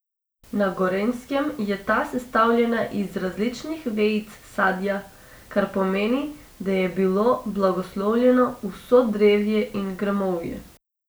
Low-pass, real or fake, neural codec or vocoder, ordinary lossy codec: none; real; none; none